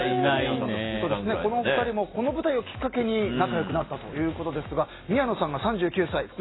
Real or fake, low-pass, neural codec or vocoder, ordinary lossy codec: real; 7.2 kHz; none; AAC, 16 kbps